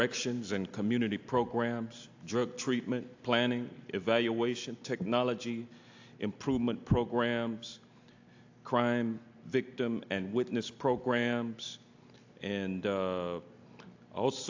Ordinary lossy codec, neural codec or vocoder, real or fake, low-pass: AAC, 48 kbps; none; real; 7.2 kHz